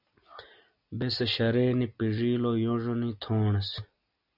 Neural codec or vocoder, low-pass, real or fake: none; 5.4 kHz; real